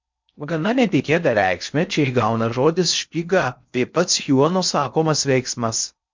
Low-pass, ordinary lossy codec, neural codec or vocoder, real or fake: 7.2 kHz; MP3, 64 kbps; codec, 16 kHz in and 24 kHz out, 0.6 kbps, FocalCodec, streaming, 4096 codes; fake